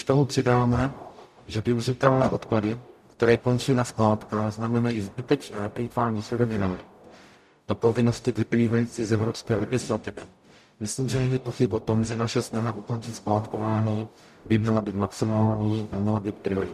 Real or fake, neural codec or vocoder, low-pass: fake; codec, 44.1 kHz, 0.9 kbps, DAC; 14.4 kHz